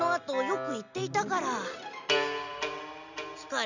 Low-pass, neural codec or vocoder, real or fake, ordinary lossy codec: 7.2 kHz; none; real; none